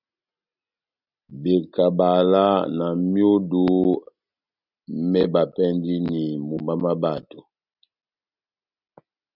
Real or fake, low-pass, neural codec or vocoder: real; 5.4 kHz; none